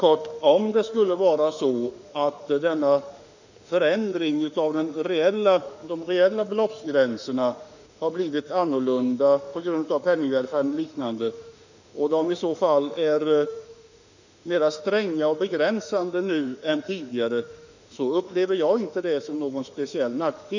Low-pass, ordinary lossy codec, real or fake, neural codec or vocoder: 7.2 kHz; none; fake; autoencoder, 48 kHz, 32 numbers a frame, DAC-VAE, trained on Japanese speech